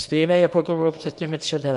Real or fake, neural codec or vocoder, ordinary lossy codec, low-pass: fake; codec, 24 kHz, 0.9 kbps, WavTokenizer, small release; MP3, 64 kbps; 10.8 kHz